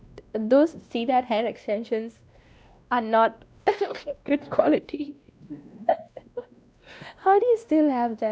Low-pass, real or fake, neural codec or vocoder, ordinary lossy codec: none; fake; codec, 16 kHz, 1 kbps, X-Codec, WavLM features, trained on Multilingual LibriSpeech; none